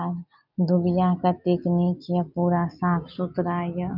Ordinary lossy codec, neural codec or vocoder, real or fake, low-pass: none; none; real; 5.4 kHz